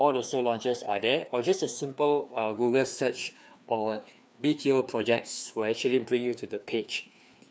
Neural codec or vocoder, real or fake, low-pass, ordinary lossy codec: codec, 16 kHz, 2 kbps, FreqCodec, larger model; fake; none; none